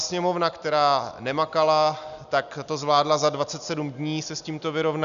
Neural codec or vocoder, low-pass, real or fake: none; 7.2 kHz; real